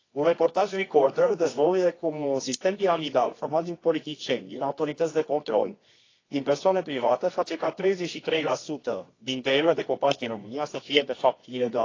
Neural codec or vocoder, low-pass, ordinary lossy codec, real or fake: codec, 24 kHz, 0.9 kbps, WavTokenizer, medium music audio release; 7.2 kHz; AAC, 32 kbps; fake